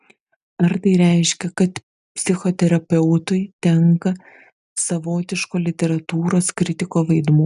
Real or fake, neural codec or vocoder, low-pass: real; none; 10.8 kHz